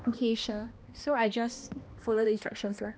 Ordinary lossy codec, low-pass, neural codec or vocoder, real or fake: none; none; codec, 16 kHz, 1 kbps, X-Codec, HuBERT features, trained on balanced general audio; fake